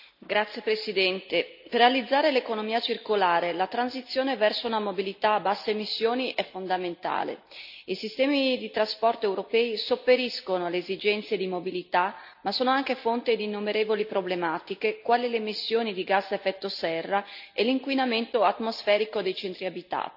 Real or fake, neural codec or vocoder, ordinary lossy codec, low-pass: real; none; none; 5.4 kHz